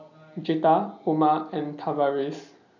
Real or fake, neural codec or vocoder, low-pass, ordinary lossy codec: real; none; 7.2 kHz; none